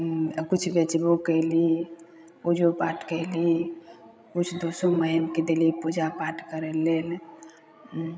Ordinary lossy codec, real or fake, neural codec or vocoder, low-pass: none; fake; codec, 16 kHz, 16 kbps, FreqCodec, larger model; none